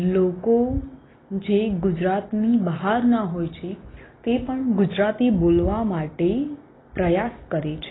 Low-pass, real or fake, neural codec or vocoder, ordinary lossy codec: 7.2 kHz; real; none; AAC, 16 kbps